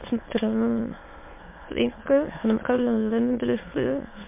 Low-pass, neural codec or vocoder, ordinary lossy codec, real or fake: 3.6 kHz; autoencoder, 22.05 kHz, a latent of 192 numbers a frame, VITS, trained on many speakers; MP3, 24 kbps; fake